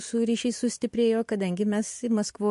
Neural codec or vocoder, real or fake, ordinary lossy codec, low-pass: none; real; MP3, 48 kbps; 14.4 kHz